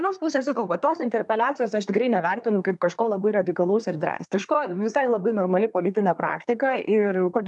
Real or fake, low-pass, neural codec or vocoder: fake; 10.8 kHz; codec, 24 kHz, 1 kbps, SNAC